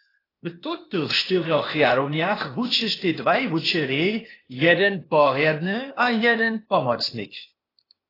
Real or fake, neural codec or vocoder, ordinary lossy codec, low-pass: fake; codec, 16 kHz, 0.8 kbps, ZipCodec; AAC, 24 kbps; 5.4 kHz